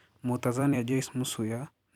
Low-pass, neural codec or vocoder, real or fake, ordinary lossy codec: 19.8 kHz; vocoder, 44.1 kHz, 128 mel bands every 256 samples, BigVGAN v2; fake; none